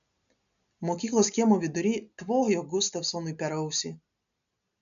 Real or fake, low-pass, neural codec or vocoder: real; 7.2 kHz; none